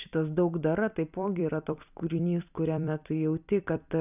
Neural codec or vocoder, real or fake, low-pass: vocoder, 44.1 kHz, 128 mel bands every 256 samples, BigVGAN v2; fake; 3.6 kHz